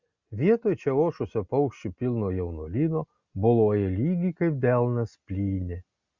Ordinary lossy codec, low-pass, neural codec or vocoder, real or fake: Opus, 64 kbps; 7.2 kHz; none; real